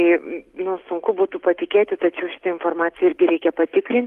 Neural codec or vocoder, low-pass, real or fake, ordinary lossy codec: none; 14.4 kHz; real; Opus, 24 kbps